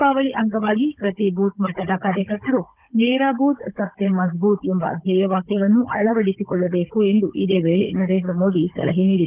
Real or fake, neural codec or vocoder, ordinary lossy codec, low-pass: fake; codec, 16 kHz, 16 kbps, FunCodec, trained on Chinese and English, 50 frames a second; Opus, 24 kbps; 3.6 kHz